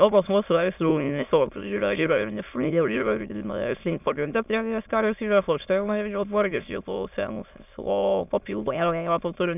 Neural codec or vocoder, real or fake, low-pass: autoencoder, 22.05 kHz, a latent of 192 numbers a frame, VITS, trained on many speakers; fake; 3.6 kHz